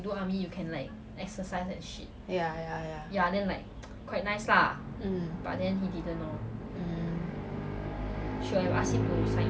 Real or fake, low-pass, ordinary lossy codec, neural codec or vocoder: real; none; none; none